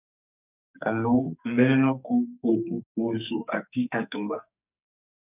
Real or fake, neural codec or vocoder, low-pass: fake; codec, 44.1 kHz, 2.6 kbps, SNAC; 3.6 kHz